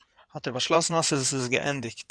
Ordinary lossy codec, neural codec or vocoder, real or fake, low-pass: Opus, 64 kbps; codec, 16 kHz in and 24 kHz out, 2.2 kbps, FireRedTTS-2 codec; fake; 9.9 kHz